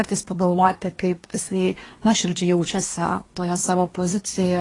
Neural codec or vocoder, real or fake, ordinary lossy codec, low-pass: codec, 24 kHz, 1 kbps, SNAC; fake; AAC, 32 kbps; 10.8 kHz